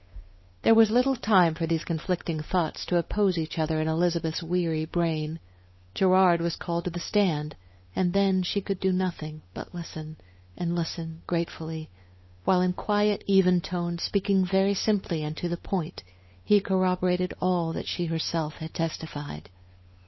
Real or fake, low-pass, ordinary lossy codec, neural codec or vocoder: fake; 7.2 kHz; MP3, 24 kbps; codec, 16 kHz, 8 kbps, FunCodec, trained on Chinese and English, 25 frames a second